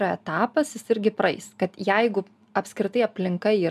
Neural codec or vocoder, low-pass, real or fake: none; 14.4 kHz; real